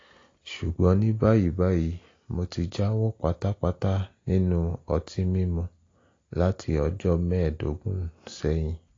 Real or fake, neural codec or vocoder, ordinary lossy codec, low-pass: real; none; AAC, 32 kbps; 7.2 kHz